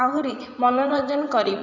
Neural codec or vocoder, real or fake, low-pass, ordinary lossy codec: vocoder, 44.1 kHz, 80 mel bands, Vocos; fake; 7.2 kHz; none